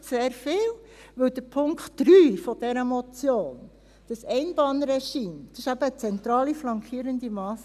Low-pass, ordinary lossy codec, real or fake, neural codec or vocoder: 14.4 kHz; none; real; none